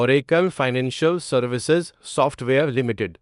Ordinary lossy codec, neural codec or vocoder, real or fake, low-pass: none; codec, 24 kHz, 0.9 kbps, WavTokenizer, small release; fake; 10.8 kHz